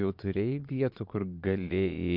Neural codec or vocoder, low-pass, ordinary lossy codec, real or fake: vocoder, 22.05 kHz, 80 mel bands, Vocos; 5.4 kHz; MP3, 48 kbps; fake